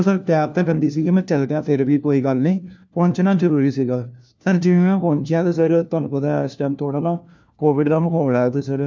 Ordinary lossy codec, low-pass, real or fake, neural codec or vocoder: none; none; fake; codec, 16 kHz, 1 kbps, FunCodec, trained on LibriTTS, 50 frames a second